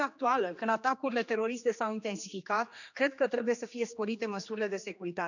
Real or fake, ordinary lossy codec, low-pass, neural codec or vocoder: fake; none; 7.2 kHz; codec, 16 kHz, 2 kbps, X-Codec, HuBERT features, trained on general audio